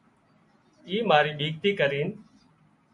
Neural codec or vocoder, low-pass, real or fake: none; 10.8 kHz; real